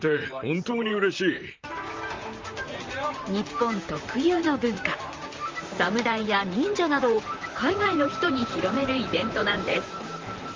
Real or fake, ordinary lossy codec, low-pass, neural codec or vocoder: fake; Opus, 32 kbps; 7.2 kHz; vocoder, 44.1 kHz, 128 mel bands, Pupu-Vocoder